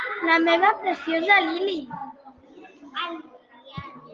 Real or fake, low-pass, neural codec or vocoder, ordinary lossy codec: real; 7.2 kHz; none; Opus, 32 kbps